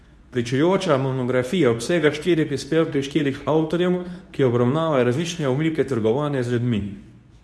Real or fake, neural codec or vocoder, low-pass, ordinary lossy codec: fake; codec, 24 kHz, 0.9 kbps, WavTokenizer, medium speech release version 2; none; none